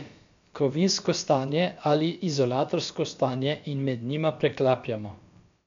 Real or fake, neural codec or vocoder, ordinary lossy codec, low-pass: fake; codec, 16 kHz, about 1 kbps, DyCAST, with the encoder's durations; MP3, 64 kbps; 7.2 kHz